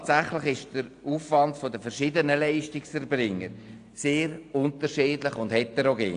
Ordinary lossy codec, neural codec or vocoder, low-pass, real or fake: AAC, 48 kbps; none; 9.9 kHz; real